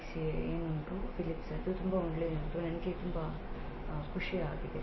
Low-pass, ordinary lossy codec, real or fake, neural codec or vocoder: 7.2 kHz; MP3, 24 kbps; real; none